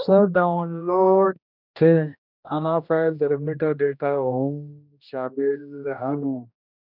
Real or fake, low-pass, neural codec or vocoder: fake; 5.4 kHz; codec, 16 kHz, 1 kbps, X-Codec, HuBERT features, trained on general audio